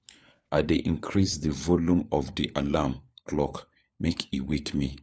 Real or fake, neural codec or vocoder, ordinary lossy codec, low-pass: fake; codec, 16 kHz, 4 kbps, FunCodec, trained on LibriTTS, 50 frames a second; none; none